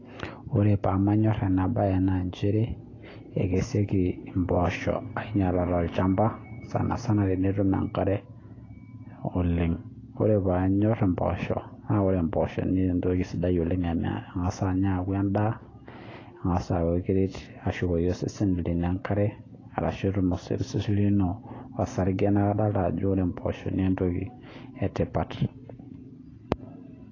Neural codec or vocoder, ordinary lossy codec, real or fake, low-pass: none; AAC, 32 kbps; real; 7.2 kHz